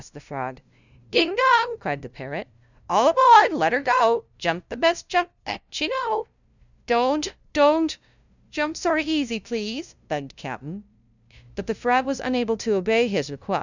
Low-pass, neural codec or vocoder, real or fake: 7.2 kHz; codec, 16 kHz, 0.5 kbps, FunCodec, trained on LibriTTS, 25 frames a second; fake